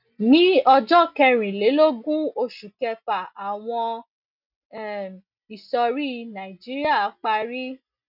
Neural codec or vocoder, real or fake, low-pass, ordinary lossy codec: none; real; 5.4 kHz; none